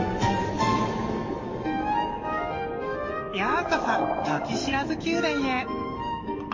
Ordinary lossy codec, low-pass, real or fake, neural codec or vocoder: MP3, 32 kbps; 7.2 kHz; fake; codec, 44.1 kHz, 7.8 kbps, DAC